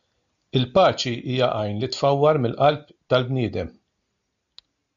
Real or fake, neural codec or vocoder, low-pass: real; none; 7.2 kHz